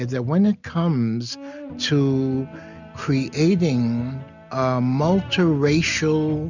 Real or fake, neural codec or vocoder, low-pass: real; none; 7.2 kHz